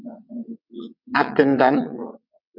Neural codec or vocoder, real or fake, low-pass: vocoder, 22.05 kHz, 80 mel bands, WaveNeXt; fake; 5.4 kHz